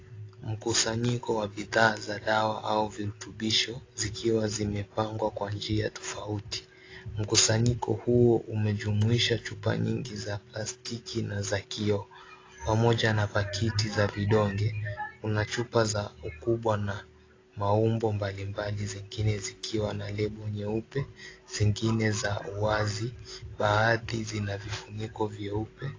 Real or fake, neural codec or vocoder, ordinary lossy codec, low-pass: real; none; AAC, 32 kbps; 7.2 kHz